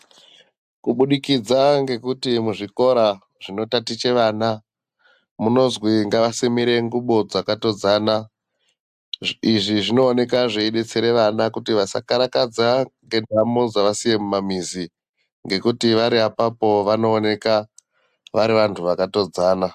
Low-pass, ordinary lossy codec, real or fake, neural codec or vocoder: 14.4 kHz; AAC, 96 kbps; real; none